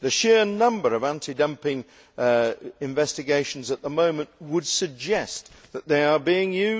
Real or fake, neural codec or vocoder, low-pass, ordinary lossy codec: real; none; none; none